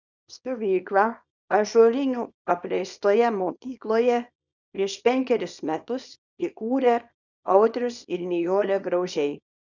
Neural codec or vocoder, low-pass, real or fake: codec, 24 kHz, 0.9 kbps, WavTokenizer, small release; 7.2 kHz; fake